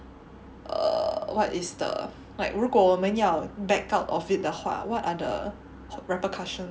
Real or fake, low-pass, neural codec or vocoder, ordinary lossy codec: real; none; none; none